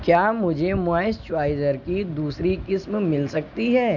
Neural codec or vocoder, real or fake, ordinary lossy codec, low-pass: none; real; none; 7.2 kHz